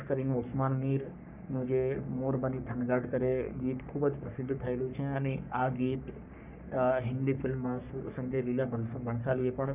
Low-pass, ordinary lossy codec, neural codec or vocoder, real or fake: 3.6 kHz; none; codec, 44.1 kHz, 3.4 kbps, Pupu-Codec; fake